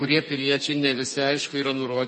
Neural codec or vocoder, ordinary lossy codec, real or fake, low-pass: codec, 32 kHz, 1.9 kbps, SNAC; MP3, 32 kbps; fake; 10.8 kHz